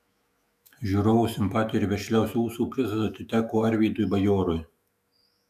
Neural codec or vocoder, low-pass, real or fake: autoencoder, 48 kHz, 128 numbers a frame, DAC-VAE, trained on Japanese speech; 14.4 kHz; fake